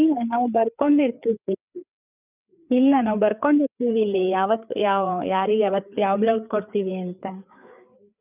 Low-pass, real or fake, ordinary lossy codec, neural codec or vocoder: 3.6 kHz; fake; none; codec, 16 kHz, 4 kbps, FreqCodec, larger model